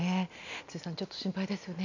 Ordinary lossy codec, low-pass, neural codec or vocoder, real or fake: none; 7.2 kHz; none; real